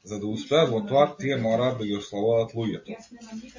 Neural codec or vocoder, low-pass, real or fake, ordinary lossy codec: none; 7.2 kHz; real; MP3, 32 kbps